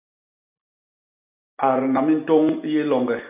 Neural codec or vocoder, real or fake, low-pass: none; real; 3.6 kHz